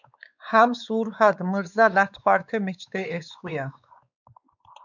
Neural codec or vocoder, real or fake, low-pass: codec, 16 kHz, 4 kbps, X-Codec, WavLM features, trained on Multilingual LibriSpeech; fake; 7.2 kHz